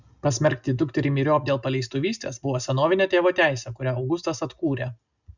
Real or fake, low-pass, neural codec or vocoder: real; 7.2 kHz; none